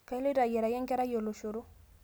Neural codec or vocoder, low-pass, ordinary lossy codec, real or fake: none; none; none; real